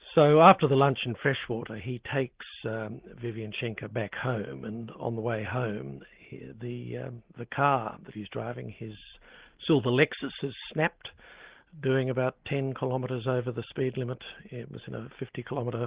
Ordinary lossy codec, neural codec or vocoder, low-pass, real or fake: Opus, 16 kbps; none; 3.6 kHz; real